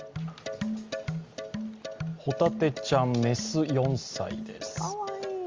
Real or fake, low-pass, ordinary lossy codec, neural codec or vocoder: real; 7.2 kHz; Opus, 32 kbps; none